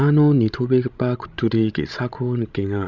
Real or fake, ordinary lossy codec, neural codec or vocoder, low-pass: fake; none; codec, 16 kHz, 16 kbps, FreqCodec, larger model; 7.2 kHz